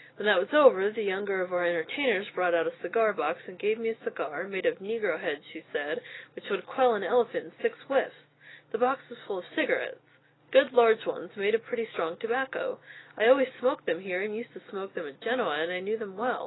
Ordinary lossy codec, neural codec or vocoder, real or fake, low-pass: AAC, 16 kbps; none; real; 7.2 kHz